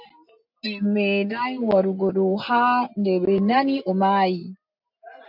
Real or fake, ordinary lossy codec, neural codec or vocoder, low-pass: real; AAC, 48 kbps; none; 5.4 kHz